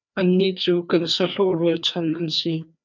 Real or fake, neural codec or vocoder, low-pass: fake; codec, 16 kHz, 2 kbps, FreqCodec, larger model; 7.2 kHz